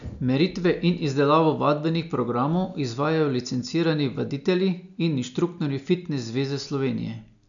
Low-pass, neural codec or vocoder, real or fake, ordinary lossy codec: 7.2 kHz; none; real; none